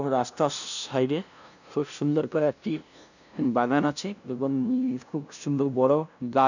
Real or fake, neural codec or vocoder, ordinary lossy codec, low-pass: fake; codec, 16 kHz, 0.5 kbps, FunCodec, trained on LibriTTS, 25 frames a second; none; 7.2 kHz